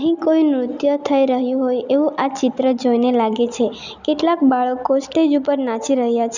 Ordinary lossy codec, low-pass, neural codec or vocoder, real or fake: none; 7.2 kHz; none; real